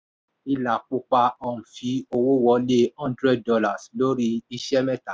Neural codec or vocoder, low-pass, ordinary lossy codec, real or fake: none; none; none; real